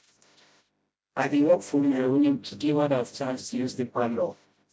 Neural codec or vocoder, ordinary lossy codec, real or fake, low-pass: codec, 16 kHz, 0.5 kbps, FreqCodec, smaller model; none; fake; none